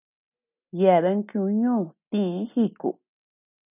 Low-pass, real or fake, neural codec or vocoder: 3.6 kHz; real; none